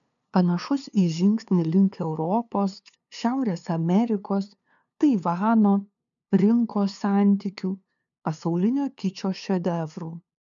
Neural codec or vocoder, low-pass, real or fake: codec, 16 kHz, 2 kbps, FunCodec, trained on LibriTTS, 25 frames a second; 7.2 kHz; fake